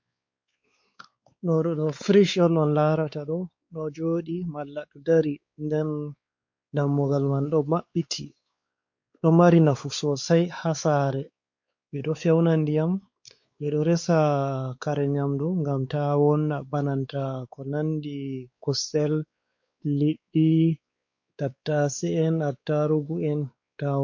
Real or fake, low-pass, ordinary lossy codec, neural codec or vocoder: fake; 7.2 kHz; MP3, 48 kbps; codec, 16 kHz, 4 kbps, X-Codec, WavLM features, trained on Multilingual LibriSpeech